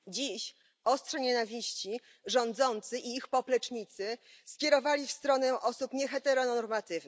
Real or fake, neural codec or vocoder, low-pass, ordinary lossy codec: real; none; none; none